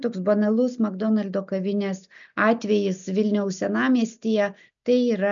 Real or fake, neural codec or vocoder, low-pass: real; none; 7.2 kHz